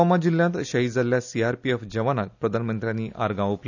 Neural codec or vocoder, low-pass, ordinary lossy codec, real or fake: none; 7.2 kHz; none; real